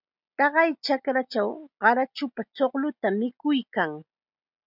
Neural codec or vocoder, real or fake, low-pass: none; real; 5.4 kHz